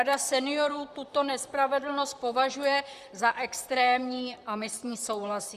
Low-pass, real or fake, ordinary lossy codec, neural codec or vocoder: 14.4 kHz; fake; Opus, 64 kbps; vocoder, 44.1 kHz, 128 mel bands, Pupu-Vocoder